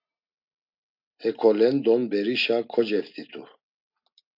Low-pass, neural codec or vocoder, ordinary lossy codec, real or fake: 5.4 kHz; none; MP3, 48 kbps; real